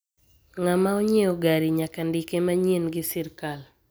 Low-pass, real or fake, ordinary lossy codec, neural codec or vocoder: none; real; none; none